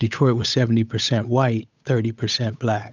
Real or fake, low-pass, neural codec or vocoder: fake; 7.2 kHz; codec, 16 kHz, 8 kbps, FunCodec, trained on Chinese and English, 25 frames a second